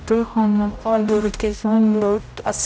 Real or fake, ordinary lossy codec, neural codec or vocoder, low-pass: fake; none; codec, 16 kHz, 0.5 kbps, X-Codec, HuBERT features, trained on general audio; none